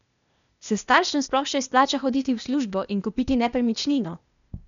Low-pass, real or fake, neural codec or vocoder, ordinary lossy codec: 7.2 kHz; fake; codec, 16 kHz, 0.8 kbps, ZipCodec; none